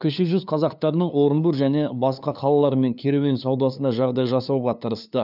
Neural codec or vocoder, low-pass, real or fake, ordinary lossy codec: codec, 16 kHz, 2 kbps, FunCodec, trained on LibriTTS, 25 frames a second; 5.4 kHz; fake; none